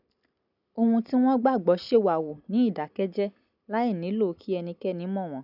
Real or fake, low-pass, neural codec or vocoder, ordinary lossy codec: real; 5.4 kHz; none; none